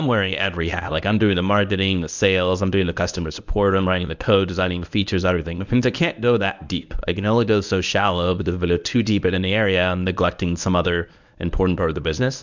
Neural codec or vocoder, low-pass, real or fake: codec, 24 kHz, 0.9 kbps, WavTokenizer, medium speech release version 1; 7.2 kHz; fake